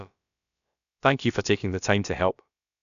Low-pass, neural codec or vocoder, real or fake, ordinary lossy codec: 7.2 kHz; codec, 16 kHz, about 1 kbps, DyCAST, with the encoder's durations; fake; none